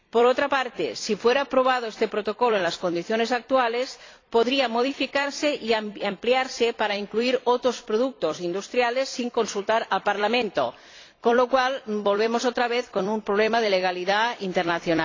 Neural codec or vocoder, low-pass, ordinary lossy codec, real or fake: vocoder, 44.1 kHz, 128 mel bands every 256 samples, BigVGAN v2; 7.2 kHz; AAC, 32 kbps; fake